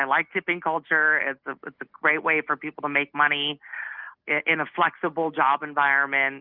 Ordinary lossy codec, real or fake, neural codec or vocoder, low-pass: Opus, 32 kbps; real; none; 5.4 kHz